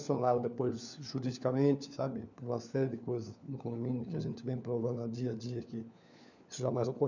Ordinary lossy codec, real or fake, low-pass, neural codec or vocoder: none; fake; 7.2 kHz; codec, 16 kHz, 4 kbps, FunCodec, trained on LibriTTS, 50 frames a second